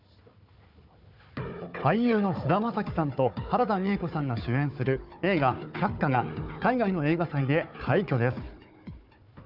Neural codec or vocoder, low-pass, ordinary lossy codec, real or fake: codec, 16 kHz, 4 kbps, FunCodec, trained on Chinese and English, 50 frames a second; 5.4 kHz; none; fake